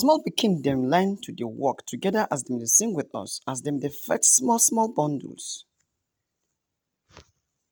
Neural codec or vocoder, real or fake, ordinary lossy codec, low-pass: none; real; none; none